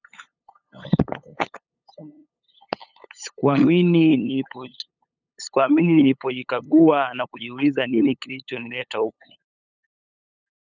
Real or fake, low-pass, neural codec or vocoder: fake; 7.2 kHz; codec, 16 kHz, 8 kbps, FunCodec, trained on LibriTTS, 25 frames a second